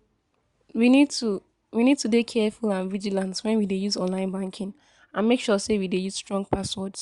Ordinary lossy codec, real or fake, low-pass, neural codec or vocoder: Opus, 64 kbps; real; 10.8 kHz; none